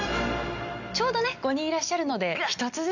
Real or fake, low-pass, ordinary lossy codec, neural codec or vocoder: real; 7.2 kHz; none; none